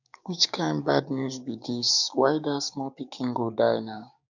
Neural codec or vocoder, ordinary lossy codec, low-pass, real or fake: codec, 16 kHz, 6 kbps, DAC; none; 7.2 kHz; fake